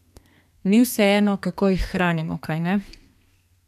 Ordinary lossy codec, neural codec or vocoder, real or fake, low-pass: none; codec, 32 kHz, 1.9 kbps, SNAC; fake; 14.4 kHz